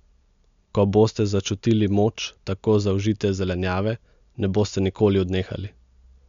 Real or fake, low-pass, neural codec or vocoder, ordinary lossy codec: real; 7.2 kHz; none; MP3, 64 kbps